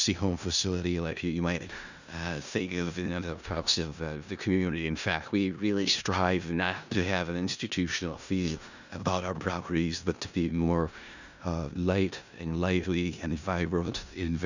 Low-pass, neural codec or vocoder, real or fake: 7.2 kHz; codec, 16 kHz in and 24 kHz out, 0.4 kbps, LongCat-Audio-Codec, four codebook decoder; fake